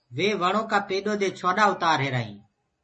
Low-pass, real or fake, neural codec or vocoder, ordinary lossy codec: 10.8 kHz; real; none; MP3, 32 kbps